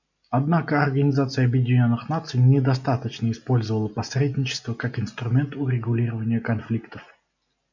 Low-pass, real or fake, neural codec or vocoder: 7.2 kHz; real; none